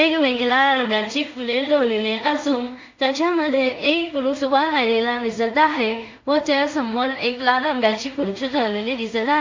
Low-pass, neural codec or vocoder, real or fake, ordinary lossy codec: 7.2 kHz; codec, 16 kHz in and 24 kHz out, 0.4 kbps, LongCat-Audio-Codec, two codebook decoder; fake; MP3, 48 kbps